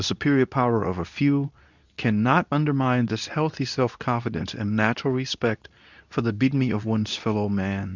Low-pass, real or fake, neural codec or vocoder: 7.2 kHz; fake; codec, 24 kHz, 0.9 kbps, WavTokenizer, medium speech release version 2